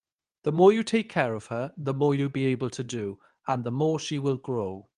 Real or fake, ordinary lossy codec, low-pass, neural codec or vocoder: fake; Opus, 32 kbps; 10.8 kHz; codec, 24 kHz, 0.9 kbps, WavTokenizer, medium speech release version 2